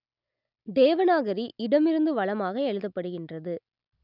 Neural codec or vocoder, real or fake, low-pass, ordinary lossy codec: none; real; 5.4 kHz; none